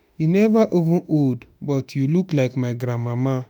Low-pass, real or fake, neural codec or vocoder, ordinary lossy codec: none; fake; autoencoder, 48 kHz, 32 numbers a frame, DAC-VAE, trained on Japanese speech; none